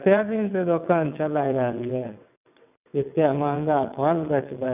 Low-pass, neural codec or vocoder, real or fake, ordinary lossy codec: 3.6 kHz; vocoder, 22.05 kHz, 80 mel bands, WaveNeXt; fake; none